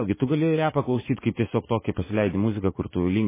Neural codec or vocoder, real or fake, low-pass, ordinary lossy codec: vocoder, 44.1 kHz, 80 mel bands, Vocos; fake; 3.6 kHz; MP3, 16 kbps